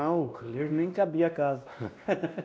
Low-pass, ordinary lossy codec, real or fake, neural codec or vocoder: none; none; fake; codec, 16 kHz, 1 kbps, X-Codec, WavLM features, trained on Multilingual LibriSpeech